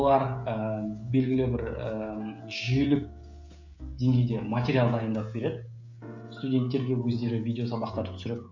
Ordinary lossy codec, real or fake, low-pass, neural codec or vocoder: none; fake; 7.2 kHz; codec, 16 kHz, 16 kbps, FreqCodec, smaller model